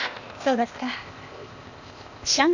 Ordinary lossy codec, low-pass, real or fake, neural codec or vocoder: none; 7.2 kHz; fake; codec, 16 kHz, 0.8 kbps, ZipCodec